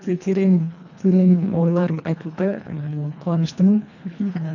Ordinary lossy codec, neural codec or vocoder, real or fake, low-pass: none; codec, 24 kHz, 1.5 kbps, HILCodec; fake; 7.2 kHz